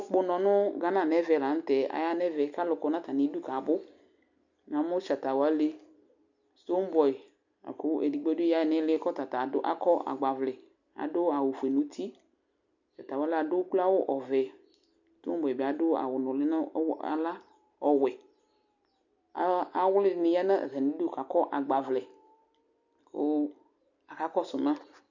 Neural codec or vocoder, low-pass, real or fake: none; 7.2 kHz; real